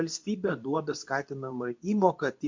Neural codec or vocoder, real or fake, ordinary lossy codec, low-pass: codec, 24 kHz, 0.9 kbps, WavTokenizer, medium speech release version 2; fake; MP3, 48 kbps; 7.2 kHz